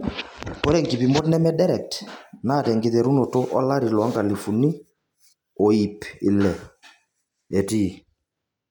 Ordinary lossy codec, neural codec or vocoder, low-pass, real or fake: none; none; 19.8 kHz; real